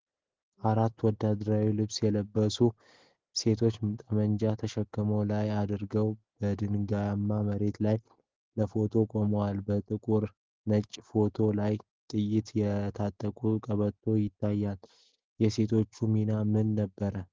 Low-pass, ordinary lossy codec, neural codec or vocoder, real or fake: 7.2 kHz; Opus, 16 kbps; none; real